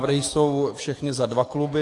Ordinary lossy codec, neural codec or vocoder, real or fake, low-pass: AAC, 48 kbps; codec, 44.1 kHz, 7.8 kbps, DAC; fake; 10.8 kHz